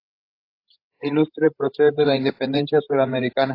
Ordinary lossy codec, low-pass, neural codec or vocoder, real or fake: AAC, 32 kbps; 5.4 kHz; codec, 16 kHz, 16 kbps, FreqCodec, larger model; fake